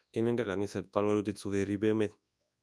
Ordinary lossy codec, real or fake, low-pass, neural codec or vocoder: none; fake; none; codec, 24 kHz, 0.9 kbps, WavTokenizer, large speech release